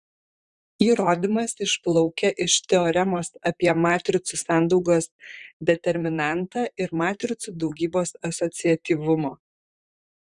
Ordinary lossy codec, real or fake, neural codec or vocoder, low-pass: Opus, 64 kbps; fake; vocoder, 44.1 kHz, 128 mel bands, Pupu-Vocoder; 10.8 kHz